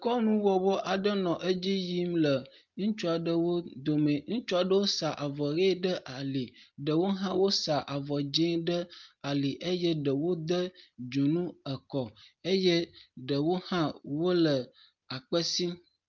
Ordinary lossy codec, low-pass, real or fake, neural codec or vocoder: Opus, 32 kbps; 7.2 kHz; real; none